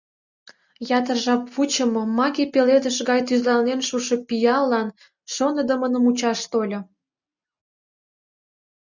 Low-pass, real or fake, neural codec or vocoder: 7.2 kHz; real; none